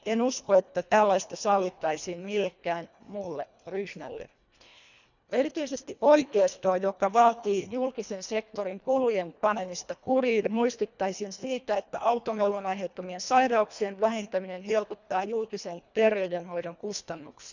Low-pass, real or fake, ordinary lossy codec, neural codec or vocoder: 7.2 kHz; fake; none; codec, 24 kHz, 1.5 kbps, HILCodec